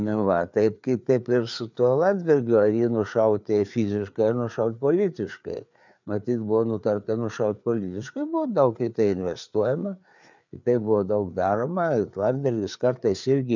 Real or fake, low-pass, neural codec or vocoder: fake; 7.2 kHz; codec, 16 kHz, 4 kbps, FreqCodec, larger model